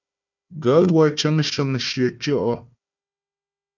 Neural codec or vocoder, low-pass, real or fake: codec, 16 kHz, 1 kbps, FunCodec, trained on Chinese and English, 50 frames a second; 7.2 kHz; fake